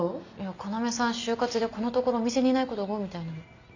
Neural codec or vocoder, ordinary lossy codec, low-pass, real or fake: none; none; 7.2 kHz; real